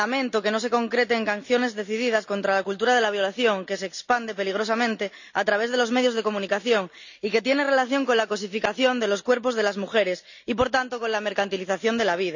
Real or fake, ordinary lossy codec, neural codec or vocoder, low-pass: real; none; none; 7.2 kHz